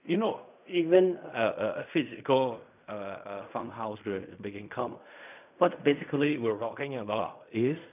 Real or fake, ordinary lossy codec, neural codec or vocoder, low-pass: fake; none; codec, 16 kHz in and 24 kHz out, 0.4 kbps, LongCat-Audio-Codec, fine tuned four codebook decoder; 3.6 kHz